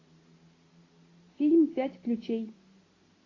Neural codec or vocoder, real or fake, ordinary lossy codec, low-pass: none; real; AAC, 32 kbps; 7.2 kHz